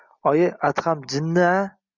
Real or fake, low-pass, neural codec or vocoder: real; 7.2 kHz; none